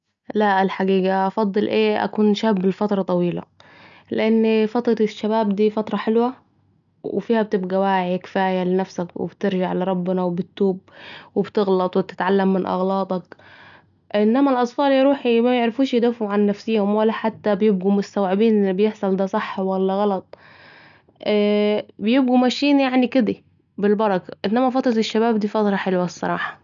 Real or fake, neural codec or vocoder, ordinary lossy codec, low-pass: real; none; none; 7.2 kHz